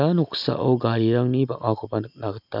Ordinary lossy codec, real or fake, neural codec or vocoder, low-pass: none; real; none; 5.4 kHz